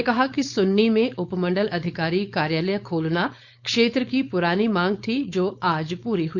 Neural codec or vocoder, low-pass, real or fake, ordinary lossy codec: codec, 16 kHz, 4.8 kbps, FACodec; 7.2 kHz; fake; none